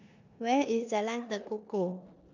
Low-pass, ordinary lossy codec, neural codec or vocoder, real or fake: 7.2 kHz; none; codec, 16 kHz in and 24 kHz out, 0.9 kbps, LongCat-Audio-Codec, four codebook decoder; fake